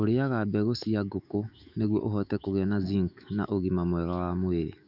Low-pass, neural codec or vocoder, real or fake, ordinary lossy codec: 5.4 kHz; autoencoder, 48 kHz, 128 numbers a frame, DAC-VAE, trained on Japanese speech; fake; none